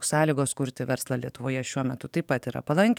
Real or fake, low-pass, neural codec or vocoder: fake; 19.8 kHz; codec, 44.1 kHz, 7.8 kbps, DAC